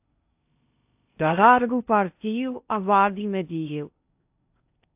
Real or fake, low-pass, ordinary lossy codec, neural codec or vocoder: fake; 3.6 kHz; AAC, 32 kbps; codec, 16 kHz in and 24 kHz out, 0.6 kbps, FocalCodec, streaming, 2048 codes